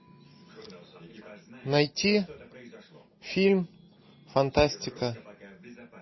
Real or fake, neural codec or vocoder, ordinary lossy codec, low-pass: real; none; MP3, 24 kbps; 7.2 kHz